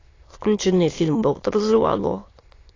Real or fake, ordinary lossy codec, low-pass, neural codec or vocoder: fake; AAC, 32 kbps; 7.2 kHz; autoencoder, 22.05 kHz, a latent of 192 numbers a frame, VITS, trained on many speakers